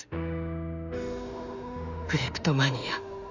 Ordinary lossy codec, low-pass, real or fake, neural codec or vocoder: none; 7.2 kHz; fake; autoencoder, 48 kHz, 32 numbers a frame, DAC-VAE, trained on Japanese speech